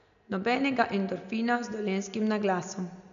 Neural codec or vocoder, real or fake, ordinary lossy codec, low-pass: none; real; none; 7.2 kHz